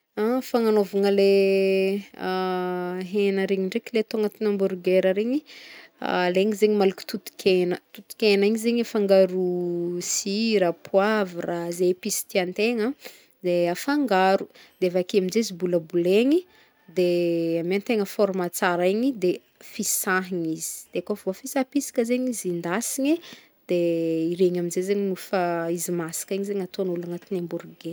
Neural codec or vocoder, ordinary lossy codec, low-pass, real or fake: none; none; none; real